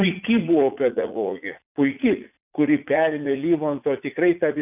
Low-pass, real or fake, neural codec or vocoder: 3.6 kHz; fake; vocoder, 44.1 kHz, 80 mel bands, Vocos